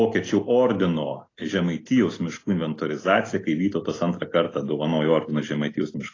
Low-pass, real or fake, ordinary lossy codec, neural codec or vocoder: 7.2 kHz; real; AAC, 32 kbps; none